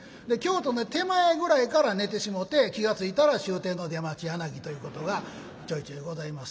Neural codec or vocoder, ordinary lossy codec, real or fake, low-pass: none; none; real; none